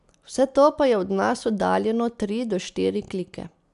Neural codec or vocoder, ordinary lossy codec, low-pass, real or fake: none; AAC, 96 kbps; 10.8 kHz; real